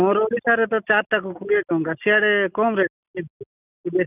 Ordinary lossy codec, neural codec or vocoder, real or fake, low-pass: none; none; real; 3.6 kHz